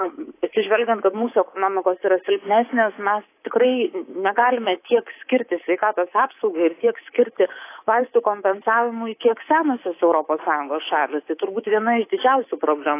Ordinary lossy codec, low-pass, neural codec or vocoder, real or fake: AAC, 24 kbps; 3.6 kHz; codec, 24 kHz, 3.1 kbps, DualCodec; fake